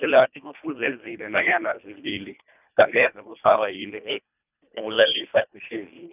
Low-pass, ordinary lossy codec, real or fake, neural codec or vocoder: 3.6 kHz; none; fake; codec, 24 kHz, 1.5 kbps, HILCodec